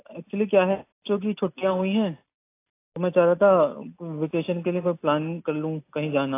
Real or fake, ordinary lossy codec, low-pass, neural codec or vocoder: real; AAC, 24 kbps; 3.6 kHz; none